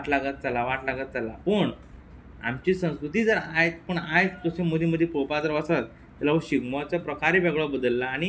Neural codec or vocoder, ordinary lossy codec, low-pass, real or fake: none; none; none; real